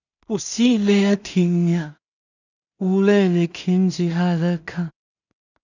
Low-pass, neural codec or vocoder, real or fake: 7.2 kHz; codec, 16 kHz in and 24 kHz out, 0.4 kbps, LongCat-Audio-Codec, two codebook decoder; fake